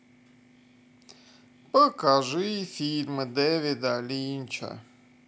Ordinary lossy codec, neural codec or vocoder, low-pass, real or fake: none; none; none; real